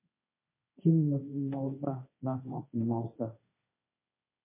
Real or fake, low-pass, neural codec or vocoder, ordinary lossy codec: fake; 3.6 kHz; codec, 16 kHz, 1.1 kbps, Voila-Tokenizer; MP3, 24 kbps